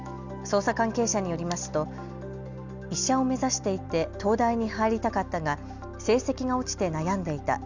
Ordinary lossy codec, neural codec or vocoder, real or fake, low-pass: none; none; real; 7.2 kHz